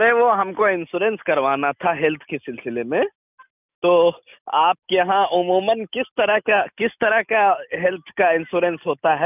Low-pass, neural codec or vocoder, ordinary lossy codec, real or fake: 3.6 kHz; none; none; real